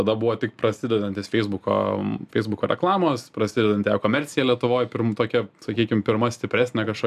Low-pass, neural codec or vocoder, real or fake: 14.4 kHz; none; real